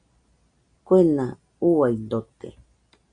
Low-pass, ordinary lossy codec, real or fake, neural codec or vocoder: 9.9 kHz; MP3, 64 kbps; real; none